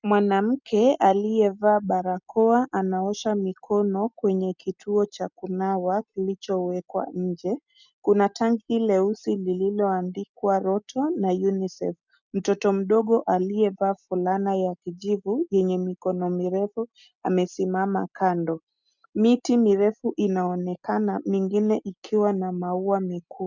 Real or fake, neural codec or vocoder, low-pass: real; none; 7.2 kHz